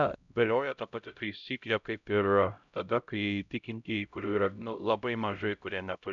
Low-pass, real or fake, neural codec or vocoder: 7.2 kHz; fake; codec, 16 kHz, 0.5 kbps, X-Codec, HuBERT features, trained on LibriSpeech